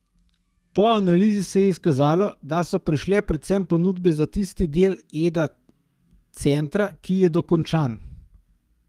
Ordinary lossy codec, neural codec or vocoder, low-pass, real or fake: Opus, 32 kbps; codec, 32 kHz, 1.9 kbps, SNAC; 14.4 kHz; fake